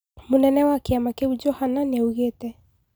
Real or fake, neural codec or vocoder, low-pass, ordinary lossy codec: real; none; none; none